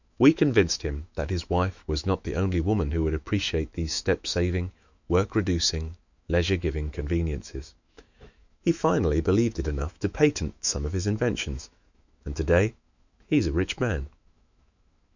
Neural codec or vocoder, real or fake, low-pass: autoencoder, 48 kHz, 128 numbers a frame, DAC-VAE, trained on Japanese speech; fake; 7.2 kHz